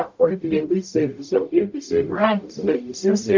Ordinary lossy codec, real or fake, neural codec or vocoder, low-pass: MP3, 64 kbps; fake; codec, 44.1 kHz, 0.9 kbps, DAC; 7.2 kHz